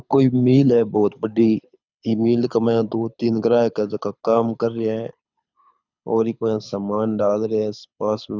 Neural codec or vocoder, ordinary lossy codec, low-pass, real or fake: codec, 24 kHz, 6 kbps, HILCodec; none; 7.2 kHz; fake